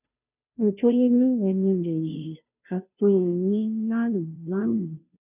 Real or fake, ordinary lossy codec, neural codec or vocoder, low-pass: fake; none; codec, 16 kHz, 0.5 kbps, FunCodec, trained on Chinese and English, 25 frames a second; 3.6 kHz